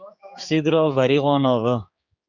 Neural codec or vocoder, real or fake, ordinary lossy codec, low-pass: codec, 16 kHz, 4 kbps, X-Codec, HuBERT features, trained on general audio; fake; Opus, 64 kbps; 7.2 kHz